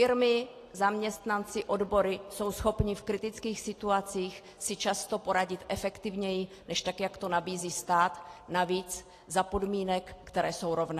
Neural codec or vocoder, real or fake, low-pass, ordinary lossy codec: none; real; 14.4 kHz; AAC, 48 kbps